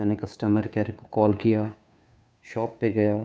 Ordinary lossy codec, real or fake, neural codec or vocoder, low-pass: none; fake; codec, 16 kHz, 2 kbps, FunCodec, trained on Chinese and English, 25 frames a second; none